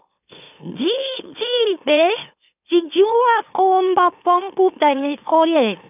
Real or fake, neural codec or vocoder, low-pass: fake; autoencoder, 44.1 kHz, a latent of 192 numbers a frame, MeloTTS; 3.6 kHz